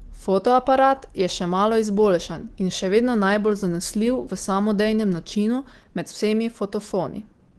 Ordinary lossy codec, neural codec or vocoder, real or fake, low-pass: Opus, 16 kbps; codec, 24 kHz, 3.1 kbps, DualCodec; fake; 10.8 kHz